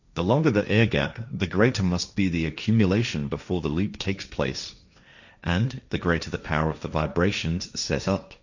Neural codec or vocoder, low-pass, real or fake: codec, 16 kHz, 1.1 kbps, Voila-Tokenizer; 7.2 kHz; fake